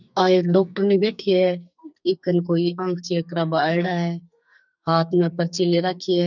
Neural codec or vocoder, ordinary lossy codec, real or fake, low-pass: codec, 44.1 kHz, 2.6 kbps, SNAC; none; fake; 7.2 kHz